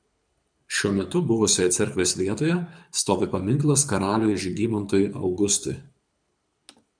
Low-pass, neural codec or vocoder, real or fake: 9.9 kHz; codec, 24 kHz, 6 kbps, HILCodec; fake